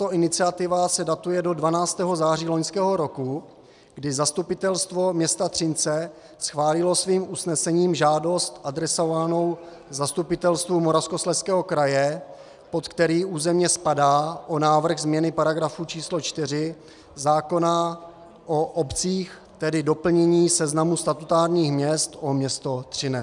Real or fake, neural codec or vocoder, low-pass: real; none; 10.8 kHz